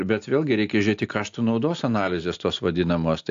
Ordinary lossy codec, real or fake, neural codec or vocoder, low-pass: AAC, 64 kbps; real; none; 7.2 kHz